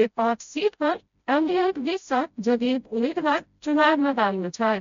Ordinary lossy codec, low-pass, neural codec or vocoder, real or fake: MP3, 48 kbps; 7.2 kHz; codec, 16 kHz, 0.5 kbps, FreqCodec, smaller model; fake